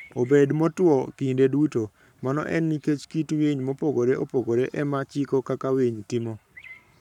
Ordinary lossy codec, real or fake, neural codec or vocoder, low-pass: none; fake; codec, 44.1 kHz, 7.8 kbps, Pupu-Codec; 19.8 kHz